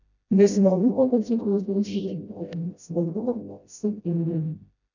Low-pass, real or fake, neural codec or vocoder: 7.2 kHz; fake; codec, 16 kHz, 0.5 kbps, FreqCodec, smaller model